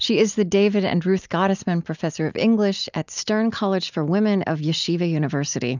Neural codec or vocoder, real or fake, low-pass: none; real; 7.2 kHz